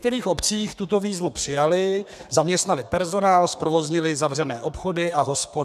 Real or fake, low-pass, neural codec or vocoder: fake; 14.4 kHz; codec, 32 kHz, 1.9 kbps, SNAC